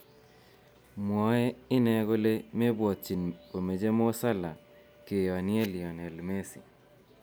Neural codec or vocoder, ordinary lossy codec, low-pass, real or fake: none; none; none; real